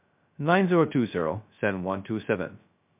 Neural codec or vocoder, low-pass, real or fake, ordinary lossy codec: codec, 16 kHz, 0.2 kbps, FocalCodec; 3.6 kHz; fake; MP3, 32 kbps